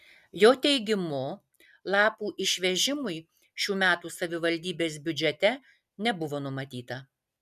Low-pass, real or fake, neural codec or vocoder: 14.4 kHz; real; none